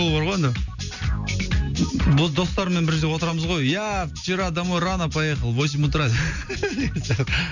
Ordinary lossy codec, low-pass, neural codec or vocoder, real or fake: none; 7.2 kHz; none; real